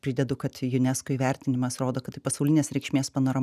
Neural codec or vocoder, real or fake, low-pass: none; real; 14.4 kHz